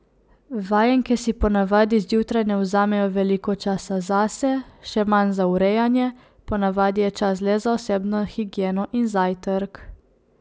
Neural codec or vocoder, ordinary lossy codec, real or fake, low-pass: none; none; real; none